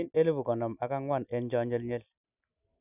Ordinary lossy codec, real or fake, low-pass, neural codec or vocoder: none; real; 3.6 kHz; none